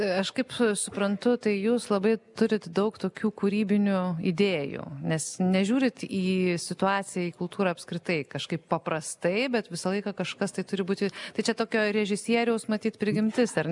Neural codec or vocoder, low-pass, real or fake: none; 10.8 kHz; real